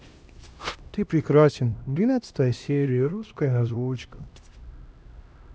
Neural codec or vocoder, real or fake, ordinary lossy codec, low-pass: codec, 16 kHz, 1 kbps, X-Codec, HuBERT features, trained on LibriSpeech; fake; none; none